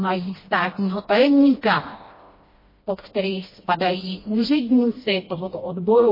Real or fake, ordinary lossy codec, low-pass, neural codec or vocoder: fake; MP3, 24 kbps; 5.4 kHz; codec, 16 kHz, 1 kbps, FreqCodec, smaller model